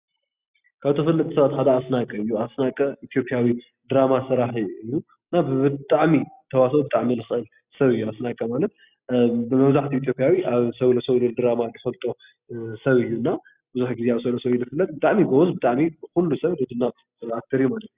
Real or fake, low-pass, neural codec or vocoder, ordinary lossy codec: real; 3.6 kHz; none; Opus, 64 kbps